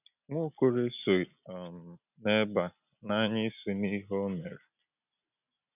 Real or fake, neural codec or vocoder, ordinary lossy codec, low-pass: real; none; none; 3.6 kHz